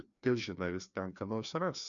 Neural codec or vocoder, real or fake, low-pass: codec, 16 kHz, 2 kbps, FreqCodec, larger model; fake; 7.2 kHz